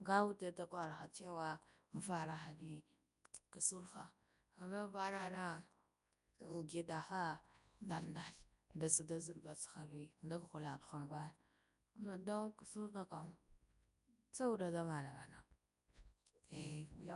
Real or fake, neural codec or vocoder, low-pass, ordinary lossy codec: fake; codec, 24 kHz, 0.9 kbps, WavTokenizer, large speech release; 10.8 kHz; none